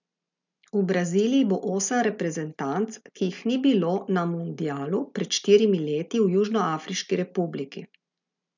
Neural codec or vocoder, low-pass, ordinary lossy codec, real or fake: none; 7.2 kHz; none; real